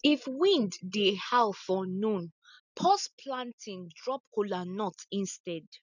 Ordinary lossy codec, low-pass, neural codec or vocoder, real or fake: none; 7.2 kHz; none; real